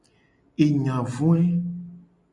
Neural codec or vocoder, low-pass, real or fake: none; 10.8 kHz; real